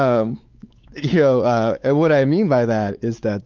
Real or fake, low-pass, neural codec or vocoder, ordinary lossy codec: fake; 7.2 kHz; codec, 16 kHz, 4 kbps, X-Codec, WavLM features, trained on Multilingual LibriSpeech; Opus, 24 kbps